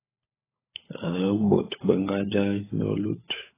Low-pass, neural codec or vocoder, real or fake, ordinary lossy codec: 3.6 kHz; codec, 16 kHz, 16 kbps, FunCodec, trained on LibriTTS, 50 frames a second; fake; AAC, 16 kbps